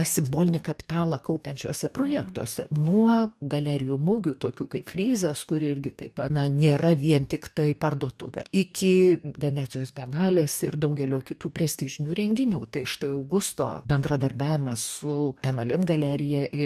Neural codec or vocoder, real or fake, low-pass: codec, 44.1 kHz, 2.6 kbps, DAC; fake; 14.4 kHz